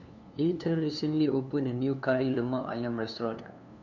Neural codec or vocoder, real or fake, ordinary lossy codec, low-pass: codec, 16 kHz, 2 kbps, FunCodec, trained on LibriTTS, 25 frames a second; fake; none; 7.2 kHz